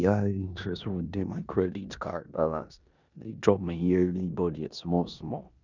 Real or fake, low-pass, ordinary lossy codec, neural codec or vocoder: fake; 7.2 kHz; none; codec, 16 kHz in and 24 kHz out, 0.9 kbps, LongCat-Audio-Codec, four codebook decoder